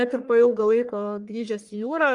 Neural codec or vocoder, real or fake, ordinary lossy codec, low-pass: codec, 44.1 kHz, 1.7 kbps, Pupu-Codec; fake; Opus, 32 kbps; 10.8 kHz